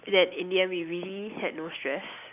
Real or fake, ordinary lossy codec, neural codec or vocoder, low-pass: real; none; none; 3.6 kHz